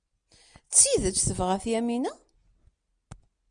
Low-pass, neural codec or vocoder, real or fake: 9.9 kHz; none; real